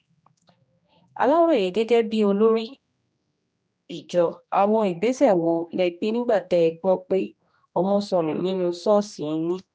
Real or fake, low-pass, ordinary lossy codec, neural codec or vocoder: fake; none; none; codec, 16 kHz, 1 kbps, X-Codec, HuBERT features, trained on general audio